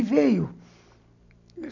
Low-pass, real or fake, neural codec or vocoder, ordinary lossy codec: 7.2 kHz; real; none; none